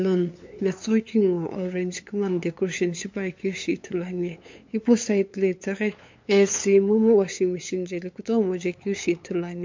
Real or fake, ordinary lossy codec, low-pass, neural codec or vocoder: fake; MP3, 48 kbps; 7.2 kHz; codec, 16 kHz, 8 kbps, FunCodec, trained on LibriTTS, 25 frames a second